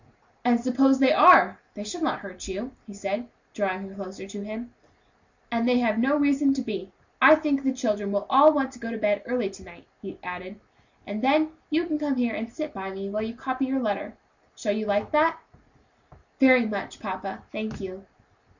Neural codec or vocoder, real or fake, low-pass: none; real; 7.2 kHz